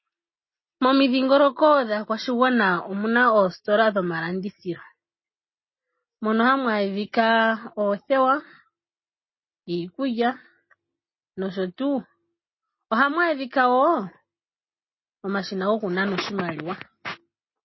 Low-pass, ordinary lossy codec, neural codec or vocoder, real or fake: 7.2 kHz; MP3, 24 kbps; none; real